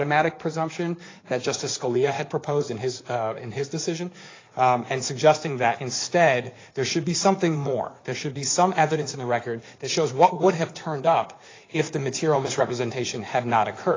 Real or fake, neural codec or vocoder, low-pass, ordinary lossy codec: fake; codec, 16 kHz in and 24 kHz out, 2.2 kbps, FireRedTTS-2 codec; 7.2 kHz; AAC, 32 kbps